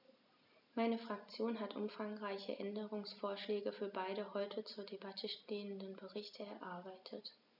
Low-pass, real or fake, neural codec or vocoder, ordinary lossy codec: 5.4 kHz; real; none; none